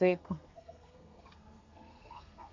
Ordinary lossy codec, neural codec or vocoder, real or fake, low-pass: MP3, 64 kbps; codec, 32 kHz, 1.9 kbps, SNAC; fake; 7.2 kHz